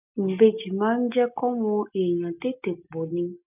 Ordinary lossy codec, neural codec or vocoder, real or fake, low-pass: none; none; real; 3.6 kHz